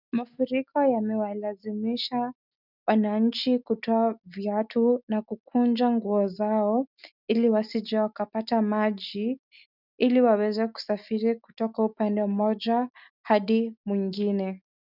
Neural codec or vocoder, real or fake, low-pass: none; real; 5.4 kHz